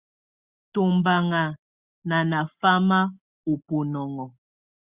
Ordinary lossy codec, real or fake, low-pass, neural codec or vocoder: Opus, 64 kbps; real; 3.6 kHz; none